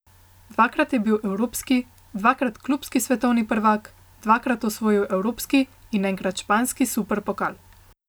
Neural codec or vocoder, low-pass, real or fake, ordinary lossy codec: none; none; real; none